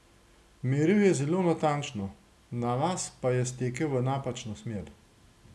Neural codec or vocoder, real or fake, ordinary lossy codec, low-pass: none; real; none; none